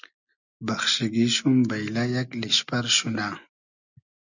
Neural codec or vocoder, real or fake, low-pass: none; real; 7.2 kHz